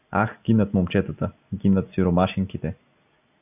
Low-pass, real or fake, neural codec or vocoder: 3.6 kHz; real; none